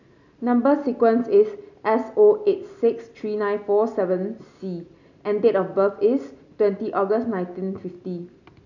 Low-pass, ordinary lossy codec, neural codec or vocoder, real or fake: 7.2 kHz; none; none; real